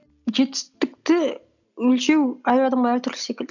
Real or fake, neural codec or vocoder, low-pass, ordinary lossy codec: real; none; 7.2 kHz; none